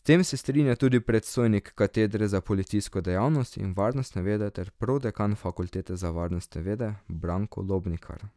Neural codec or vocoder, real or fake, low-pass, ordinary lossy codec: none; real; none; none